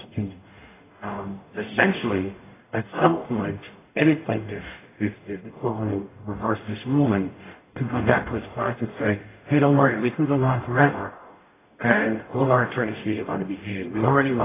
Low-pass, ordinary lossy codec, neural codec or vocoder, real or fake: 3.6 kHz; AAC, 16 kbps; codec, 44.1 kHz, 0.9 kbps, DAC; fake